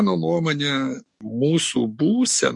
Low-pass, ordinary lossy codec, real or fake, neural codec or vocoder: 10.8 kHz; MP3, 48 kbps; fake; codec, 44.1 kHz, 7.8 kbps, DAC